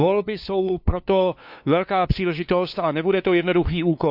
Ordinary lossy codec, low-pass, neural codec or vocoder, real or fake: none; 5.4 kHz; codec, 16 kHz, 2 kbps, FunCodec, trained on LibriTTS, 25 frames a second; fake